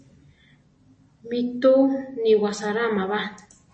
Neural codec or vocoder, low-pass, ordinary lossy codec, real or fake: none; 9.9 kHz; MP3, 32 kbps; real